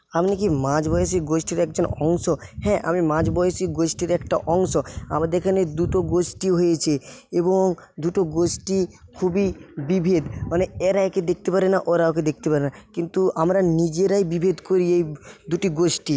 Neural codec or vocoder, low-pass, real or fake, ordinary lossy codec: none; none; real; none